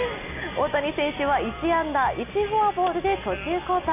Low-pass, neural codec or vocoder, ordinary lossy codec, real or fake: 3.6 kHz; none; none; real